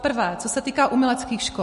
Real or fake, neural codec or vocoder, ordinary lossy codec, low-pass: real; none; MP3, 48 kbps; 14.4 kHz